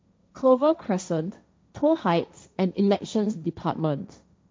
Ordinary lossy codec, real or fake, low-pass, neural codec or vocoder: none; fake; none; codec, 16 kHz, 1.1 kbps, Voila-Tokenizer